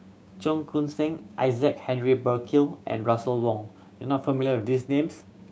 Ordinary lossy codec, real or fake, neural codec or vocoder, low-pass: none; fake; codec, 16 kHz, 6 kbps, DAC; none